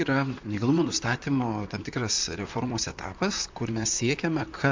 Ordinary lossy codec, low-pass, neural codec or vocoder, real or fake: MP3, 64 kbps; 7.2 kHz; vocoder, 44.1 kHz, 128 mel bands, Pupu-Vocoder; fake